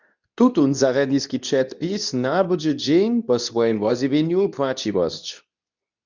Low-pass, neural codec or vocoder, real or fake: 7.2 kHz; codec, 24 kHz, 0.9 kbps, WavTokenizer, medium speech release version 2; fake